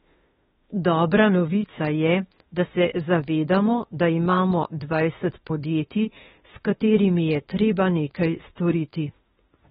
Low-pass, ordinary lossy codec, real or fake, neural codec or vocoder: 19.8 kHz; AAC, 16 kbps; fake; autoencoder, 48 kHz, 32 numbers a frame, DAC-VAE, trained on Japanese speech